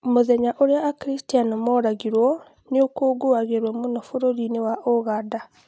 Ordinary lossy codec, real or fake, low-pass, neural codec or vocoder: none; real; none; none